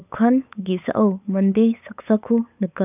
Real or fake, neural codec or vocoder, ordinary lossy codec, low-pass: fake; codec, 16 kHz, 8 kbps, FunCodec, trained on LibriTTS, 25 frames a second; none; 3.6 kHz